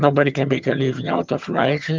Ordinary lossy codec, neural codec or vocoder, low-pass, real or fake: Opus, 24 kbps; vocoder, 22.05 kHz, 80 mel bands, HiFi-GAN; 7.2 kHz; fake